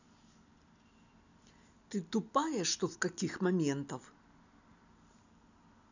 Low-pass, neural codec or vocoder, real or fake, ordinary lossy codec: 7.2 kHz; none; real; none